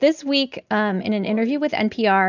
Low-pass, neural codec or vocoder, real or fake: 7.2 kHz; none; real